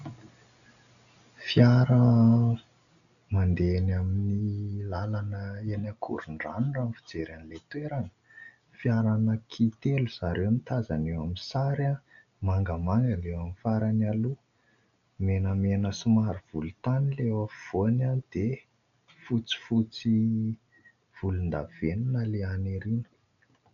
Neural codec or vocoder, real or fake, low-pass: none; real; 7.2 kHz